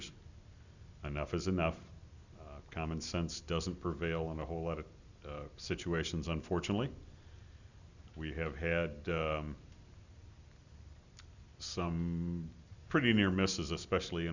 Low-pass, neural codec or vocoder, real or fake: 7.2 kHz; none; real